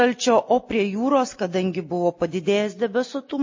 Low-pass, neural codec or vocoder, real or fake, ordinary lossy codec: 7.2 kHz; none; real; MP3, 32 kbps